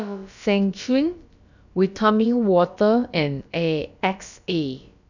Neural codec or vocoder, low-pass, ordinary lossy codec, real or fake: codec, 16 kHz, about 1 kbps, DyCAST, with the encoder's durations; 7.2 kHz; none; fake